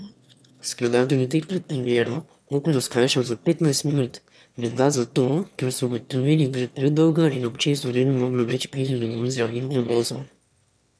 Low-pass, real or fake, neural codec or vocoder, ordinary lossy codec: none; fake; autoencoder, 22.05 kHz, a latent of 192 numbers a frame, VITS, trained on one speaker; none